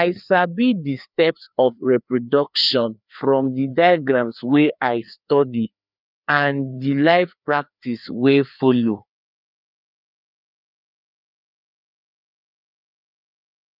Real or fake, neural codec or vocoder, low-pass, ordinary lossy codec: fake; codec, 16 kHz, 2 kbps, FreqCodec, larger model; 5.4 kHz; none